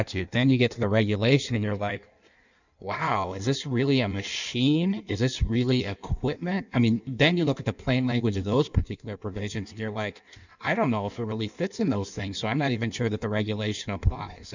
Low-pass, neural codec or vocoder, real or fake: 7.2 kHz; codec, 16 kHz in and 24 kHz out, 1.1 kbps, FireRedTTS-2 codec; fake